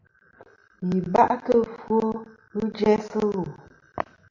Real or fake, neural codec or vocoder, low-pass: real; none; 7.2 kHz